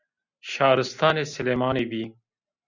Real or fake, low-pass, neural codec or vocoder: real; 7.2 kHz; none